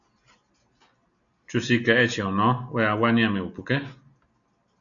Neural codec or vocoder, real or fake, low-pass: none; real; 7.2 kHz